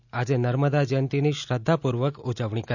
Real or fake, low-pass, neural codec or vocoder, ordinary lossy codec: real; 7.2 kHz; none; none